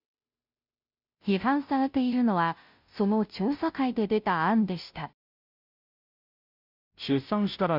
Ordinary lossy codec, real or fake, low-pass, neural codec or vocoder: none; fake; 5.4 kHz; codec, 16 kHz, 0.5 kbps, FunCodec, trained on Chinese and English, 25 frames a second